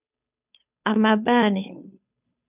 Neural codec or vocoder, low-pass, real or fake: codec, 16 kHz, 2 kbps, FunCodec, trained on Chinese and English, 25 frames a second; 3.6 kHz; fake